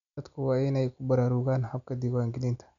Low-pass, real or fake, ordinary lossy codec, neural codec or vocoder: 7.2 kHz; real; none; none